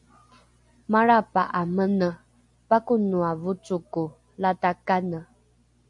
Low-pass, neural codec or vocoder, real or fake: 10.8 kHz; none; real